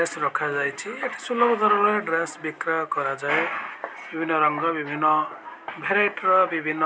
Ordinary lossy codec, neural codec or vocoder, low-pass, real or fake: none; none; none; real